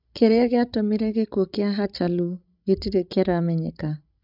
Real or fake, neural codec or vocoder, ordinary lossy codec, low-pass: fake; codec, 16 kHz, 16 kbps, FreqCodec, larger model; none; 5.4 kHz